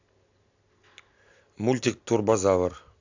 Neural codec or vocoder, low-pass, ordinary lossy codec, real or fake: none; 7.2 kHz; AAC, 48 kbps; real